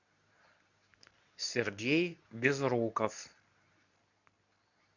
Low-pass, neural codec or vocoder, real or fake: 7.2 kHz; codec, 24 kHz, 0.9 kbps, WavTokenizer, medium speech release version 2; fake